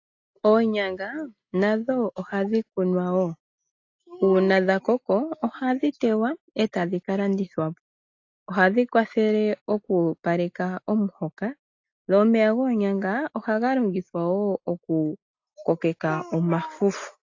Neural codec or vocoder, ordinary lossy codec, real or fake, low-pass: none; AAC, 48 kbps; real; 7.2 kHz